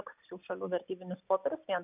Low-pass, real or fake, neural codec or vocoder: 3.6 kHz; real; none